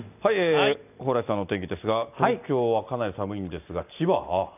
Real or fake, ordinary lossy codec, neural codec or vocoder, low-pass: real; none; none; 3.6 kHz